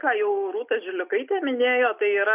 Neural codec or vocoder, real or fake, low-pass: none; real; 3.6 kHz